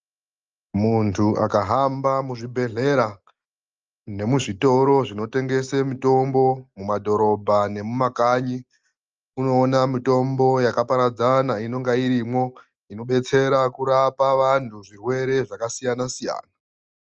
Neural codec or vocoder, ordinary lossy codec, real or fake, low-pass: none; Opus, 24 kbps; real; 7.2 kHz